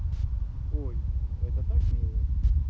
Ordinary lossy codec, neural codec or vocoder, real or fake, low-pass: none; none; real; none